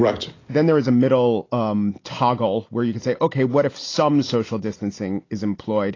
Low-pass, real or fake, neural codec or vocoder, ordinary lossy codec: 7.2 kHz; real; none; AAC, 32 kbps